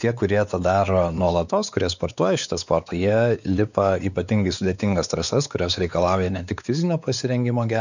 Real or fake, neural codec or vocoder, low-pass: fake; codec, 16 kHz, 4 kbps, X-Codec, WavLM features, trained on Multilingual LibriSpeech; 7.2 kHz